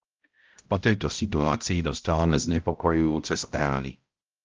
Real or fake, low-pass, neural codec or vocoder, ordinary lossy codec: fake; 7.2 kHz; codec, 16 kHz, 0.5 kbps, X-Codec, HuBERT features, trained on balanced general audio; Opus, 24 kbps